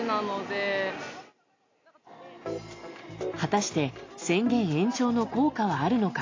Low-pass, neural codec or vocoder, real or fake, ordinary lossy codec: 7.2 kHz; none; real; AAC, 32 kbps